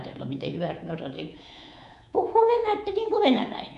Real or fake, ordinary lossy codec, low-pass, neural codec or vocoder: fake; none; 10.8 kHz; codec, 24 kHz, 3.1 kbps, DualCodec